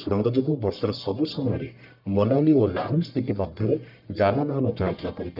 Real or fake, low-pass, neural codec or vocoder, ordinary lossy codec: fake; 5.4 kHz; codec, 44.1 kHz, 1.7 kbps, Pupu-Codec; none